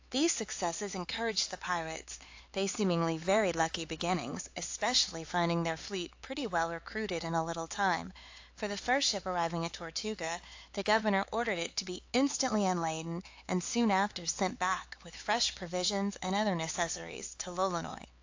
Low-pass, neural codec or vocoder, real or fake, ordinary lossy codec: 7.2 kHz; codec, 16 kHz, 4 kbps, X-Codec, HuBERT features, trained on LibriSpeech; fake; AAC, 48 kbps